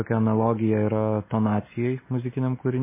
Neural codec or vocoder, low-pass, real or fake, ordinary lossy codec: none; 3.6 kHz; real; MP3, 16 kbps